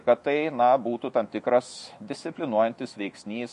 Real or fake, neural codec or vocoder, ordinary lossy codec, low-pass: fake; autoencoder, 48 kHz, 128 numbers a frame, DAC-VAE, trained on Japanese speech; MP3, 48 kbps; 14.4 kHz